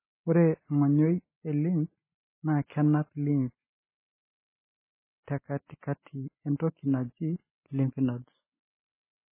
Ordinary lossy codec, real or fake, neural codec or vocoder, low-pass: MP3, 16 kbps; real; none; 3.6 kHz